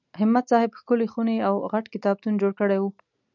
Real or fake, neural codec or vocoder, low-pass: real; none; 7.2 kHz